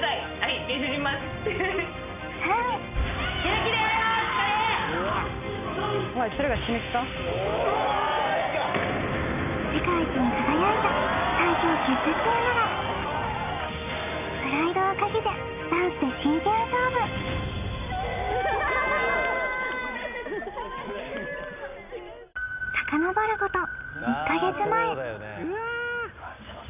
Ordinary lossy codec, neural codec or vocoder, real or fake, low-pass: none; none; real; 3.6 kHz